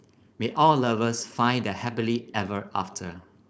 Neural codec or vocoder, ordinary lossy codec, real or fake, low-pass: codec, 16 kHz, 4.8 kbps, FACodec; none; fake; none